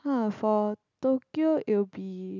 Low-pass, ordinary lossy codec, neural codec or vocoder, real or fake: 7.2 kHz; none; none; real